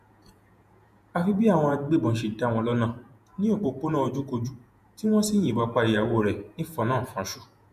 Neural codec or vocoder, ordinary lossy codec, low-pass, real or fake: vocoder, 48 kHz, 128 mel bands, Vocos; none; 14.4 kHz; fake